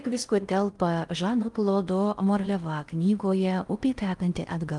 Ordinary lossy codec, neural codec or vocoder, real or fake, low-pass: Opus, 32 kbps; codec, 16 kHz in and 24 kHz out, 0.6 kbps, FocalCodec, streaming, 4096 codes; fake; 10.8 kHz